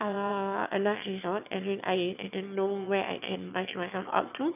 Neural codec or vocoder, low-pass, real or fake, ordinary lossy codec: autoencoder, 22.05 kHz, a latent of 192 numbers a frame, VITS, trained on one speaker; 3.6 kHz; fake; none